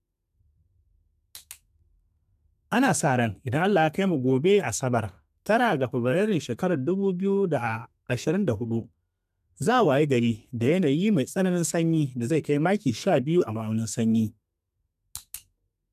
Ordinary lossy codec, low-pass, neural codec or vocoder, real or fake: none; 14.4 kHz; codec, 32 kHz, 1.9 kbps, SNAC; fake